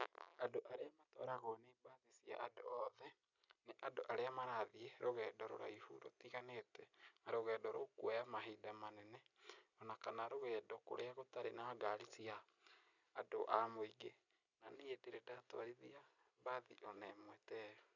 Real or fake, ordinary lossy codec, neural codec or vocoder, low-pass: real; none; none; 7.2 kHz